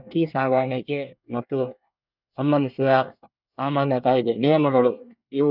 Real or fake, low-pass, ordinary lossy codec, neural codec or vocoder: fake; 5.4 kHz; none; codec, 24 kHz, 1 kbps, SNAC